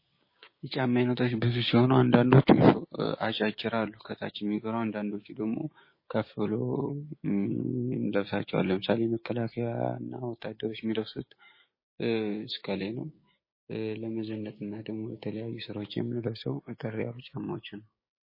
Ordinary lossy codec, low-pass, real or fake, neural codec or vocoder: MP3, 24 kbps; 5.4 kHz; fake; codec, 16 kHz, 6 kbps, DAC